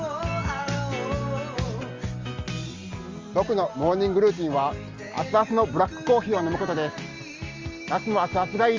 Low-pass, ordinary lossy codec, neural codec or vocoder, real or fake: 7.2 kHz; Opus, 32 kbps; none; real